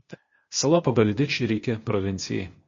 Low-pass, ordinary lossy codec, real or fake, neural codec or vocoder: 7.2 kHz; MP3, 32 kbps; fake; codec, 16 kHz, 0.8 kbps, ZipCodec